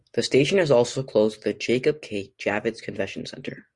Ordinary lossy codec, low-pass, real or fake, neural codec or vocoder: Opus, 64 kbps; 10.8 kHz; real; none